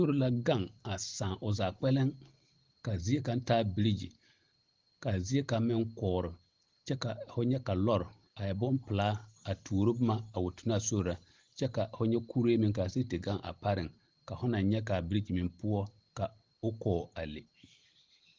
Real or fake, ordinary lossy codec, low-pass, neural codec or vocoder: real; Opus, 16 kbps; 7.2 kHz; none